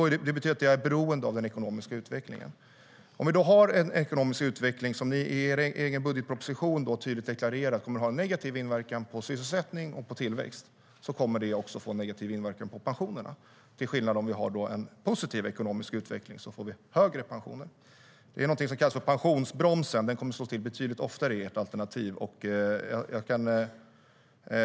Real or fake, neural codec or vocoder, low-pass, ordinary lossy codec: real; none; none; none